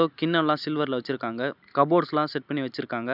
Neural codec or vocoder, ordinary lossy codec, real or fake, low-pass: none; none; real; 5.4 kHz